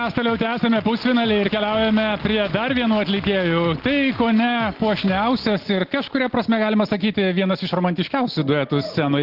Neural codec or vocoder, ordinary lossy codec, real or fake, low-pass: none; Opus, 16 kbps; real; 5.4 kHz